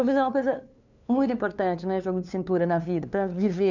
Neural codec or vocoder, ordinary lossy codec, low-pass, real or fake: codec, 16 kHz, 2 kbps, FunCodec, trained on LibriTTS, 25 frames a second; none; 7.2 kHz; fake